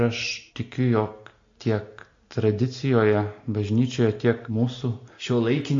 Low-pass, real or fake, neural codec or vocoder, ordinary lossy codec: 7.2 kHz; real; none; AAC, 48 kbps